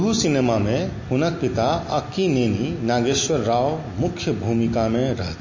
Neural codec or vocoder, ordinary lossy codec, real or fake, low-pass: none; MP3, 32 kbps; real; 7.2 kHz